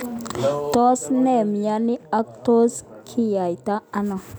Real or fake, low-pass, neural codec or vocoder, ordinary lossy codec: real; none; none; none